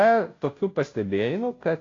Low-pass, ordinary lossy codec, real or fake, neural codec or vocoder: 7.2 kHz; AAC, 32 kbps; fake; codec, 16 kHz, 0.5 kbps, FunCodec, trained on Chinese and English, 25 frames a second